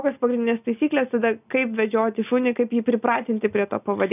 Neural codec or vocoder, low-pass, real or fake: none; 3.6 kHz; real